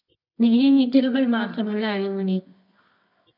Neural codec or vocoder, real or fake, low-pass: codec, 24 kHz, 0.9 kbps, WavTokenizer, medium music audio release; fake; 5.4 kHz